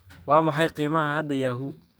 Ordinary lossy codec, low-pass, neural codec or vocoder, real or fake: none; none; codec, 44.1 kHz, 2.6 kbps, SNAC; fake